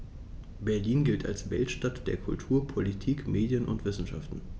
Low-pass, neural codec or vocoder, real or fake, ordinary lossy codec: none; none; real; none